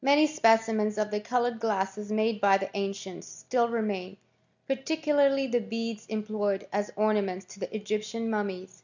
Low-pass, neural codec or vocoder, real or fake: 7.2 kHz; none; real